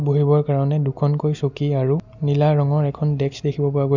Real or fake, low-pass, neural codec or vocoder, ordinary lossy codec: real; 7.2 kHz; none; none